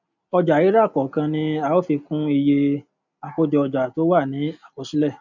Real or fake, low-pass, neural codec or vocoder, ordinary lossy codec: real; 7.2 kHz; none; none